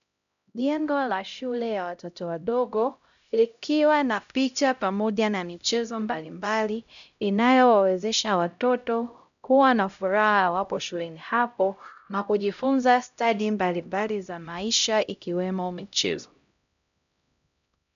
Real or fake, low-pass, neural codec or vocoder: fake; 7.2 kHz; codec, 16 kHz, 0.5 kbps, X-Codec, HuBERT features, trained on LibriSpeech